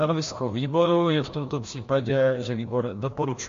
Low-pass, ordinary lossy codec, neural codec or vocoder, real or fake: 7.2 kHz; MP3, 48 kbps; codec, 16 kHz, 1 kbps, FreqCodec, larger model; fake